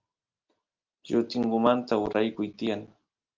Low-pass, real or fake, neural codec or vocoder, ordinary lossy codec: 7.2 kHz; real; none; Opus, 32 kbps